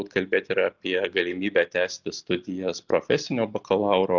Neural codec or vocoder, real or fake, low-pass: none; real; 7.2 kHz